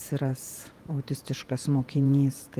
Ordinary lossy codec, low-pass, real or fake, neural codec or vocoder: Opus, 24 kbps; 14.4 kHz; fake; vocoder, 48 kHz, 128 mel bands, Vocos